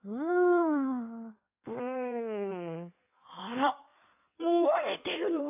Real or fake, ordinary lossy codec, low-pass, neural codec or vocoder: fake; AAC, 32 kbps; 3.6 kHz; codec, 16 kHz in and 24 kHz out, 1.1 kbps, FireRedTTS-2 codec